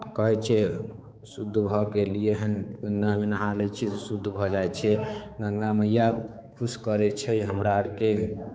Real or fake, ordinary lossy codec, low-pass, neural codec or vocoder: fake; none; none; codec, 16 kHz, 4 kbps, X-Codec, HuBERT features, trained on balanced general audio